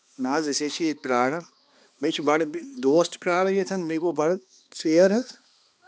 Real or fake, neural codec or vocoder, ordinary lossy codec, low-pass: fake; codec, 16 kHz, 2 kbps, X-Codec, HuBERT features, trained on balanced general audio; none; none